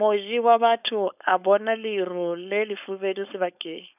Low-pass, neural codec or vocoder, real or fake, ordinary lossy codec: 3.6 kHz; codec, 16 kHz, 4.8 kbps, FACodec; fake; none